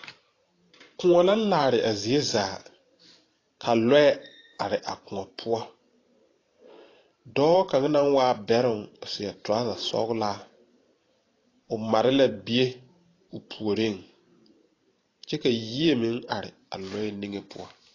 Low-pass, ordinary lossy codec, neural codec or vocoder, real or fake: 7.2 kHz; AAC, 32 kbps; vocoder, 44.1 kHz, 128 mel bands every 512 samples, BigVGAN v2; fake